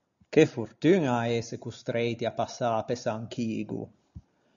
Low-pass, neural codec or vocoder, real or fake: 7.2 kHz; none; real